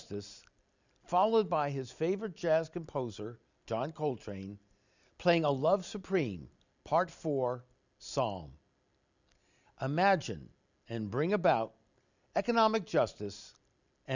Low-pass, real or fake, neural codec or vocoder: 7.2 kHz; real; none